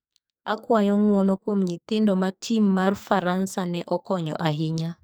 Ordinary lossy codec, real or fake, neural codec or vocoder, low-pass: none; fake; codec, 44.1 kHz, 2.6 kbps, SNAC; none